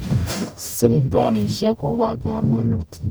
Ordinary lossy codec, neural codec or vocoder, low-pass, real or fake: none; codec, 44.1 kHz, 0.9 kbps, DAC; none; fake